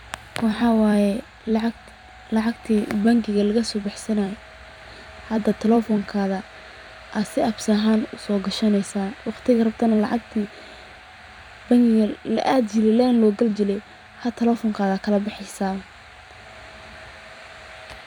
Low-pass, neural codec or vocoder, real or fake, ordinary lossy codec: 19.8 kHz; vocoder, 44.1 kHz, 128 mel bands every 256 samples, BigVGAN v2; fake; none